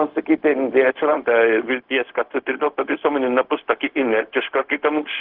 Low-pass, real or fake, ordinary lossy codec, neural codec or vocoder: 5.4 kHz; fake; Opus, 16 kbps; codec, 16 kHz, 0.4 kbps, LongCat-Audio-Codec